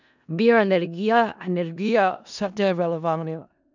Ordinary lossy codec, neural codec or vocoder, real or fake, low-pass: none; codec, 16 kHz in and 24 kHz out, 0.4 kbps, LongCat-Audio-Codec, four codebook decoder; fake; 7.2 kHz